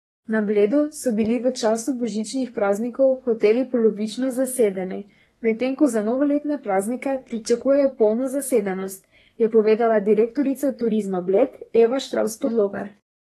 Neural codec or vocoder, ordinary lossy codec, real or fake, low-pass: codec, 32 kHz, 1.9 kbps, SNAC; AAC, 32 kbps; fake; 14.4 kHz